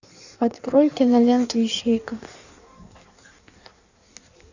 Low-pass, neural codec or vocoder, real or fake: 7.2 kHz; codec, 16 kHz in and 24 kHz out, 1.1 kbps, FireRedTTS-2 codec; fake